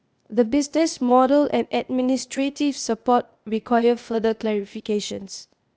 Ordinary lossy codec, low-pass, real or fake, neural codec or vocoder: none; none; fake; codec, 16 kHz, 0.8 kbps, ZipCodec